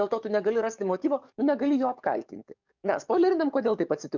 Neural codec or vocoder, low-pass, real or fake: codec, 44.1 kHz, 7.8 kbps, DAC; 7.2 kHz; fake